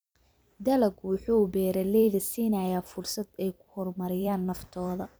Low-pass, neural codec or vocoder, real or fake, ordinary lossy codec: none; vocoder, 44.1 kHz, 128 mel bands every 256 samples, BigVGAN v2; fake; none